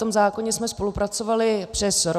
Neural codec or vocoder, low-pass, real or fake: none; 14.4 kHz; real